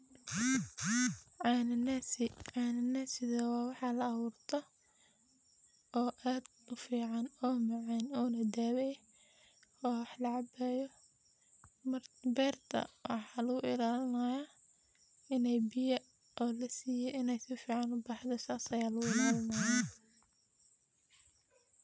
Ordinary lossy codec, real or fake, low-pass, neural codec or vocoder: none; real; none; none